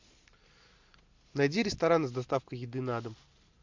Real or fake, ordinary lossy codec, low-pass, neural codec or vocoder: real; MP3, 64 kbps; 7.2 kHz; none